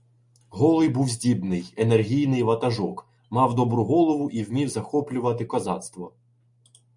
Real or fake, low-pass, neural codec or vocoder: real; 10.8 kHz; none